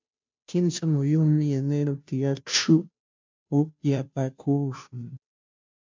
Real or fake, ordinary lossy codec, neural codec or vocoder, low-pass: fake; MP3, 64 kbps; codec, 16 kHz, 0.5 kbps, FunCodec, trained on Chinese and English, 25 frames a second; 7.2 kHz